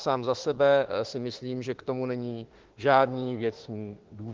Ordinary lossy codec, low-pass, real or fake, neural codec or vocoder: Opus, 16 kbps; 7.2 kHz; fake; autoencoder, 48 kHz, 32 numbers a frame, DAC-VAE, trained on Japanese speech